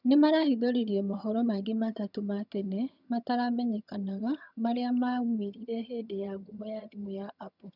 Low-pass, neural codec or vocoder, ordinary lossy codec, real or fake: 5.4 kHz; vocoder, 22.05 kHz, 80 mel bands, HiFi-GAN; none; fake